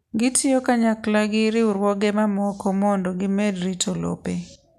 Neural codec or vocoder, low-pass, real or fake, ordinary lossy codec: none; 14.4 kHz; real; none